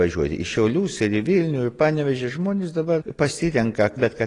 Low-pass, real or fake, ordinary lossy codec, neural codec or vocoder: 10.8 kHz; real; AAC, 32 kbps; none